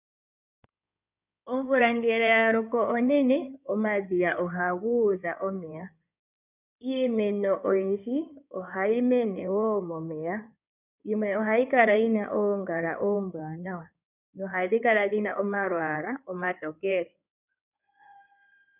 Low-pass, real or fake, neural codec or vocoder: 3.6 kHz; fake; codec, 16 kHz in and 24 kHz out, 2.2 kbps, FireRedTTS-2 codec